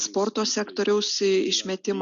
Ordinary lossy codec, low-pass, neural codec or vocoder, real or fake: Opus, 64 kbps; 7.2 kHz; none; real